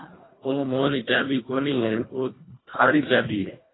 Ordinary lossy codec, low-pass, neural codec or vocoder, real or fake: AAC, 16 kbps; 7.2 kHz; codec, 24 kHz, 1.5 kbps, HILCodec; fake